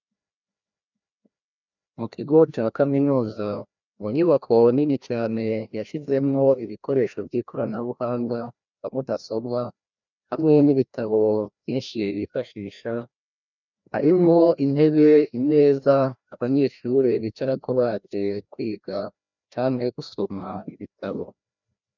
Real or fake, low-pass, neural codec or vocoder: fake; 7.2 kHz; codec, 16 kHz, 1 kbps, FreqCodec, larger model